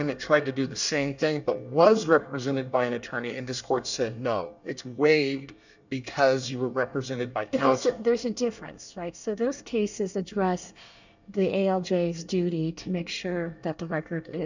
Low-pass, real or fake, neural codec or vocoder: 7.2 kHz; fake; codec, 24 kHz, 1 kbps, SNAC